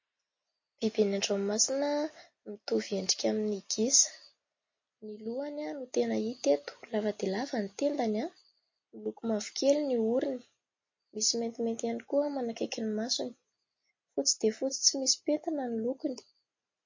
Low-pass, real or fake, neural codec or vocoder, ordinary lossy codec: 7.2 kHz; real; none; MP3, 32 kbps